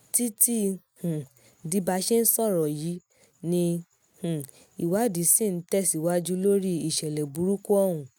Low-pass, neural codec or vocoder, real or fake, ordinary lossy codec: none; none; real; none